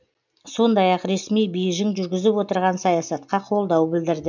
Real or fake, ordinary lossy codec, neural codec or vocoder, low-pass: real; none; none; 7.2 kHz